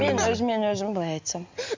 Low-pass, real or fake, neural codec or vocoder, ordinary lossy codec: 7.2 kHz; real; none; MP3, 64 kbps